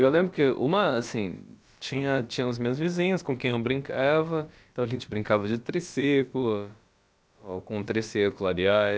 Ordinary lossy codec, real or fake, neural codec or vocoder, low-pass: none; fake; codec, 16 kHz, about 1 kbps, DyCAST, with the encoder's durations; none